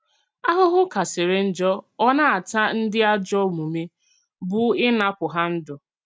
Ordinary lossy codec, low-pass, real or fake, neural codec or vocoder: none; none; real; none